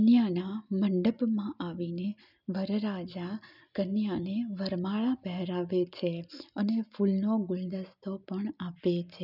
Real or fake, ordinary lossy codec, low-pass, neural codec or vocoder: real; none; 5.4 kHz; none